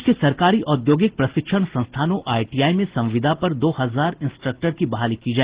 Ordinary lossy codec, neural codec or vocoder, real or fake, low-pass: Opus, 16 kbps; none; real; 3.6 kHz